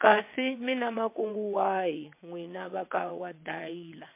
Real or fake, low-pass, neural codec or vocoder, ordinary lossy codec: fake; 3.6 kHz; vocoder, 22.05 kHz, 80 mel bands, WaveNeXt; MP3, 24 kbps